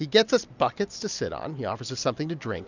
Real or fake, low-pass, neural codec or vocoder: real; 7.2 kHz; none